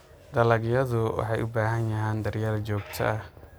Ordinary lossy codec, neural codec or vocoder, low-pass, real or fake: none; none; none; real